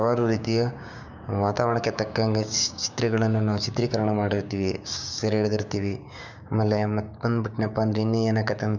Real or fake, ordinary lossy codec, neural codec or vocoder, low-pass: fake; none; codec, 16 kHz, 6 kbps, DAC; 7.2 kHz